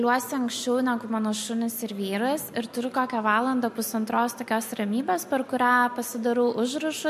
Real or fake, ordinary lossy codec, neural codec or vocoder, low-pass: real; MP3, 64 kbps; none; 14.4 kHz